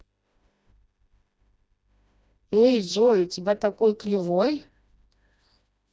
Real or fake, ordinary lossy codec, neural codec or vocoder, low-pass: fake; none; codec, 16 kHz, 1 kbps, FreqCodec, smaller model; none